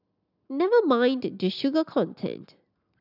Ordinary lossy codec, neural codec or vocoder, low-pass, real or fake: none; none; 5.4 kHz; real